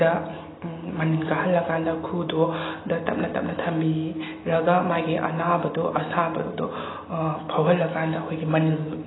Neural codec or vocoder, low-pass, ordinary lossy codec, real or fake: none; 7.2 kHz; AAC, 16 kbps; real